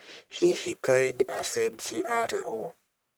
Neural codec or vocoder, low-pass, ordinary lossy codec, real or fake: codec, 44.1 kHz, 1.7 kbps, Pupu-Codec; none; none; fake